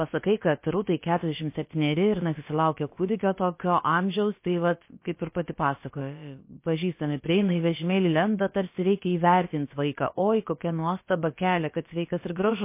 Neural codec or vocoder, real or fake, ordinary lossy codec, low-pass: codec, 16 kHz, about 1 kbps, DyCAST, with the encoder's durations; fake; MP3, 24 kbps; 3.6 kHz